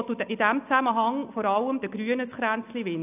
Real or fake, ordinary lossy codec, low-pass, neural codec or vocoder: real; none; 3.6 kHz; none